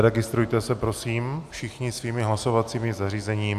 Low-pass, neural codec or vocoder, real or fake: 14.4 kHz; none; real